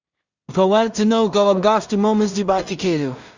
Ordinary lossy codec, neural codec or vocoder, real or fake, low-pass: Opus, 64 kbps; codec, 16 kHz in and 24 kHz out, 0.4 kbps, LongCat-Audio-Codec, two codebook decoder; fake; 7.2 kHz